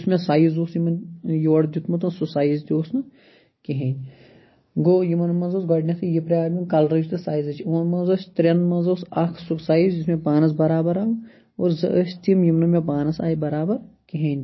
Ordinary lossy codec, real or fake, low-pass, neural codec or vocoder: MP3, 24 kbps; real; 7.2 kHz; none